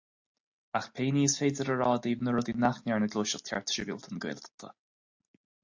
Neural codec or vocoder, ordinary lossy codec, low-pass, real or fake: none; AAC, 48 kbps; 7.2 kHz; real